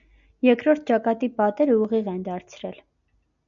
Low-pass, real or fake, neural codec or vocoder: 7.2 kHz; real; none